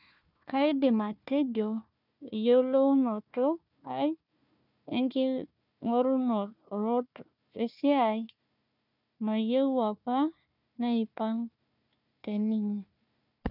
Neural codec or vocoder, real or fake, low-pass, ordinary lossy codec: codec, 16 kHz, 1 kbps, FunCodec, trained on Chinese and English, 50 frames a second; fake; 5.4 kHz; none